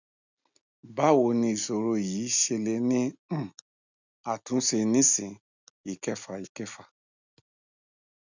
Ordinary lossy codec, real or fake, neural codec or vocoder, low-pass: none; real; none; 7.2 kHz